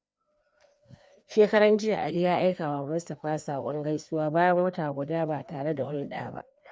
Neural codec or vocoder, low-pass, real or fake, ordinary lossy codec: codec, 16 kHz, 2 kbps, FreqCodec, larger model; none; fake; none